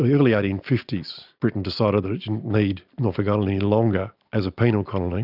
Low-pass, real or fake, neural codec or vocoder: 5.4 kHz; real; none